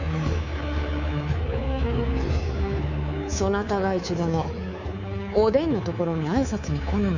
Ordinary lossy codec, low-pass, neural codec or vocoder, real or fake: none; 7.2 kHz; codec, 24 kHz, 3.1 kbps, DualCodec; fake